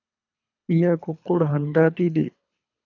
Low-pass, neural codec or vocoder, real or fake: 7.2 kHz; codec, 24 kHz, 3 kbps, HILCodec; fake